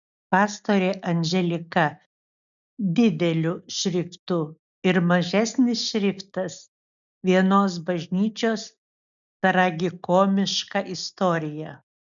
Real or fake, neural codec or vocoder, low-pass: real; none; 7.2 kHz